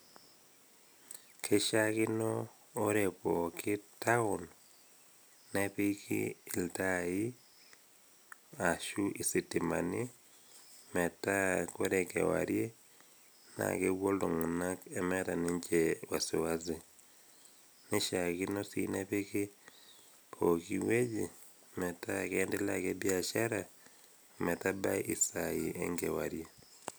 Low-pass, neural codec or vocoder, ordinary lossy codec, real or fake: none; none; none; real